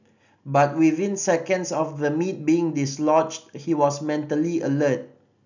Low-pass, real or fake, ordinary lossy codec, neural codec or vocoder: 7.2 kHz; real; none; none